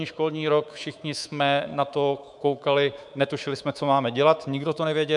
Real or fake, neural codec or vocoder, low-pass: fake; codec, 24 kHz, 3.1 kbps, DualCodec; 10.8 kHz